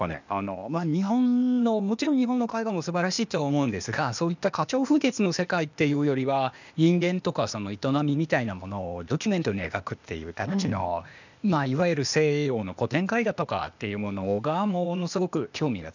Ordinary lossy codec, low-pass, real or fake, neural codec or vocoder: none; 7.2 kHz; fake; codec, 16 kHz, 0.8 kbps, ZipCodec